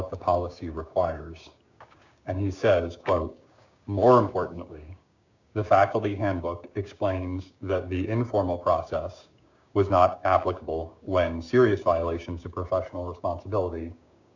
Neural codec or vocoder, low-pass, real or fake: codec, 16 kHz, 6 kbps, DAC; 7.2 kHz; fake